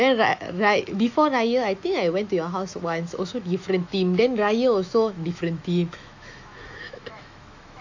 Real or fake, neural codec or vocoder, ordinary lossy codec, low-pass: fake; autoencoder, 48 kHz, 128 numbers a frame, DAC-VAE, trained on Japanese speech; AAC, 48 kbps; 7.2 kHz